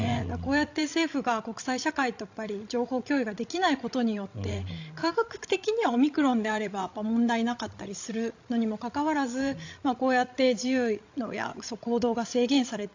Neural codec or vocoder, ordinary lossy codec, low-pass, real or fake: codec, 16 kHz, 16 kbps, FreqCodec, larger model; AAC, 48 kbps; 7.2 kHz; fake